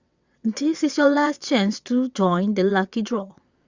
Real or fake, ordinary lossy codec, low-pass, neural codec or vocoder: fake; Opus, 64 kbps; 7.2 kHz; vocoder, 22.05 kHz, 80 mel bands, WaveNeXt